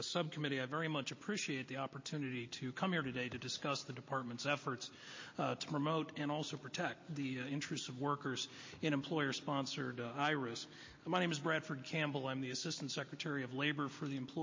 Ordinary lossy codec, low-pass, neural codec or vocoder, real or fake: MP3, 32 kbps; 7.2 kHz; none; real